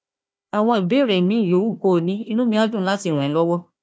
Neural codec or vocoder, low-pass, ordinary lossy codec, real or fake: codec, 16 kHz, 1 kbps, FunCodec, trained on Chinese and English, 50 frames a second; none; none; fake